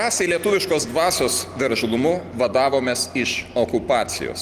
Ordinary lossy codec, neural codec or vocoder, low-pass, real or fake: Opus, 24 kbps; none; 14.4 kHz; real